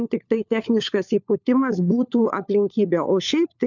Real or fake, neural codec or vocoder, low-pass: fake; codec, 16 kHz, 4 kbps, FunCodec, trained on LibriTTS, 50 frames a second; 7.2 kHz